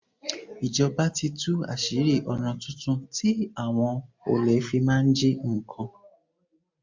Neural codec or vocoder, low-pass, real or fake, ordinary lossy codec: none; 7.2 kHz; real; MP3, 64 kbps